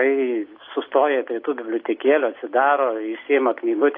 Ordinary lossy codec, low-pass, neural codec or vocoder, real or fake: AAC, 32 kbps; 5.4 kHz; none; real